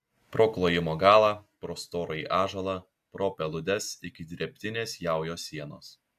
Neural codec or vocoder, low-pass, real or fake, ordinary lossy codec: none; 14.4 kHz; real; AAC, 96 kbps